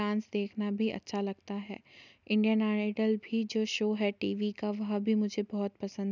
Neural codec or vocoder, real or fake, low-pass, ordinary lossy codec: none; real; 7.2 kHz; none